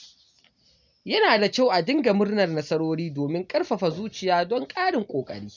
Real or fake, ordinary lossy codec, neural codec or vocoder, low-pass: real; none; none; 7.2 kHz